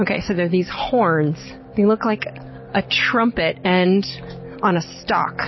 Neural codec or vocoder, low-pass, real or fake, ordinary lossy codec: none; 7.2 kHz; real; MP3, 24 kbps